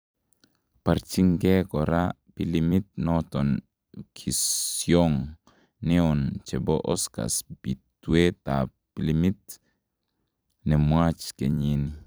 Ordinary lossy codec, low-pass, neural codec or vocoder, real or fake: none; none; vocoder, 44.1 kHz, 128 mel bands every 256 samples, BigVGAN v2; fake